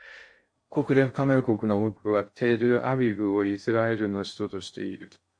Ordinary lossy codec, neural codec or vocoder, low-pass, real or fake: MP3, 48 kbps; codec, 16 kHz in and 24 kHz out, 0.6 kbps, FocalCodec, streaming, 4096 codes; 9.9 kHz; fake